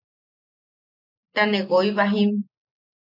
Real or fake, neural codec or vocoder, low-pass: real; none; 5.4 kHz